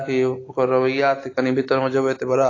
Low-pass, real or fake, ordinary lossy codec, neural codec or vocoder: 7.2 kHz; real; AAC, 32 kbps; none